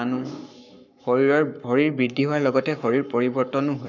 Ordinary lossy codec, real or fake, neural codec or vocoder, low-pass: none; fake; codec, 44.1 kHz, 7.8 kbps, Pupu-Codec; 7.2 kHz